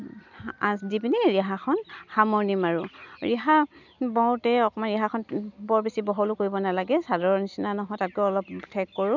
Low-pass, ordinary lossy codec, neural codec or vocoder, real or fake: 7.2 kHz; none; none; real